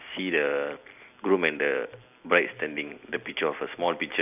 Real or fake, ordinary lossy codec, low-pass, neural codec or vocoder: real; none; 3.6 kHz; none